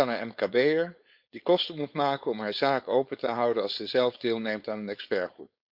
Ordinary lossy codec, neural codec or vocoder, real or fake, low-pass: Opus, 64 kbps; codec, 16 kHz, 4.8 kbps, FACodec; fake; 5.4 kHz